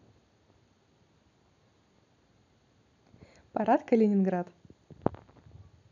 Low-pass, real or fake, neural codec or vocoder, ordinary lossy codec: 7.2 kHz; real; none; none